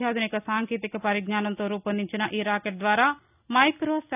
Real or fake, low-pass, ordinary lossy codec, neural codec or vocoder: real; 3.6 kHz; none; none